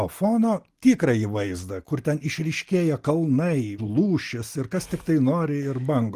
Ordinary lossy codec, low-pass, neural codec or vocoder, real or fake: Opus, 24 kbps; 14.4 kHz; none; real